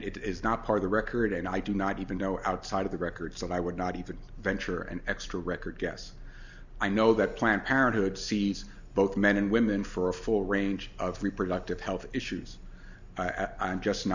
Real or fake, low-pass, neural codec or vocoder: real; 7.2 kHz; none